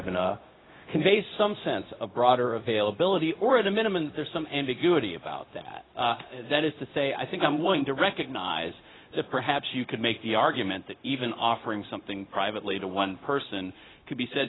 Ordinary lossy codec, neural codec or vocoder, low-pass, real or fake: AAC, 16 kbps; codec, 16 kHz, 0.4 kbps, LongCat-Audio-Codec; 7.2 kHz; fake